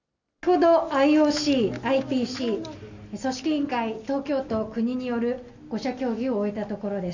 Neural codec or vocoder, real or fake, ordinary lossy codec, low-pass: none; real; none; 7.2 kHz